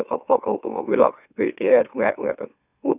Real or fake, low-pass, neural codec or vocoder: fake; 3.6 kHz; autoencoder, 44.1 kHz, a latent of 192 numbers a frame, MeloTTS